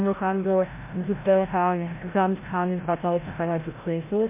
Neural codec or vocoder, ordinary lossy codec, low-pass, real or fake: codec, 16 kHz, 0.5 kbps, FreqCodec, larger model; MP3, 24 kbps; 3.6 kHz; fake